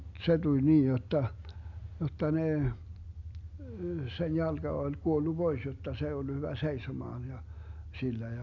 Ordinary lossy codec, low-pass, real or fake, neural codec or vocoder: MP3, 64 kbps; 7.2 kHz; real; none